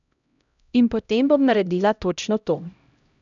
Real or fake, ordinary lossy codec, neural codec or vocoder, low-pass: fake; none; codec, 16 kHz, 0.5 kbps, X-Codec, HuBERT features, trained on LibriSpeech; 7.2 kHz